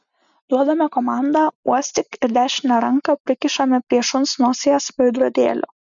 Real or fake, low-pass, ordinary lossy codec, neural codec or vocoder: real; 7.2 kHz; MP3, 96 kbps; none